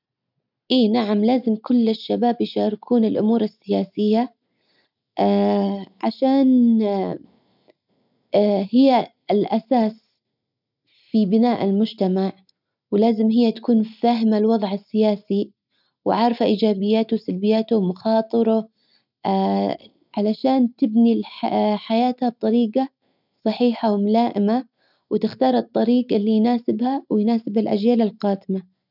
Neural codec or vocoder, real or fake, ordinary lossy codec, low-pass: none; real; none; 5.4 kHz